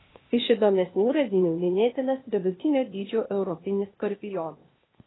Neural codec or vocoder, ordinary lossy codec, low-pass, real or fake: codec, 16 kHz, 0.8 kbps, ZipCodec; AAC, 16 kbps; 7.2 kHz; fake